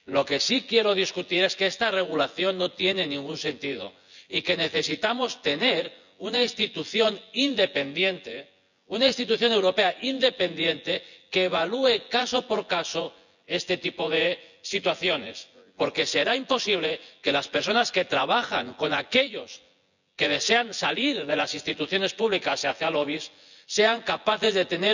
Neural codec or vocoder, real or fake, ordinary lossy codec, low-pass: vocoder, 24 kHz, 100 mel bands, Vocos; fake; none; 7.2 kHz